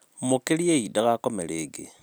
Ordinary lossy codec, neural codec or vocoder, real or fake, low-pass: none; none; real; none